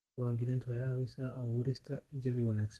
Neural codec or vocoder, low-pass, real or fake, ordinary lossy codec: codec, 32 kHz, 1.9 kbps, SNAC; 14.4 kHz; fake; Opus, 24 kbps